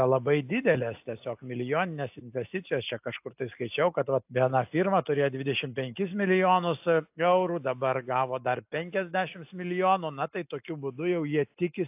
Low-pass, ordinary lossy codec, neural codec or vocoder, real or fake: 3.6 kHz; AAC, 32 kbps; none; real